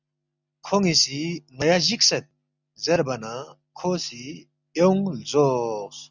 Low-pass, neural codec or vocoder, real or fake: 7.2 kHz; none; real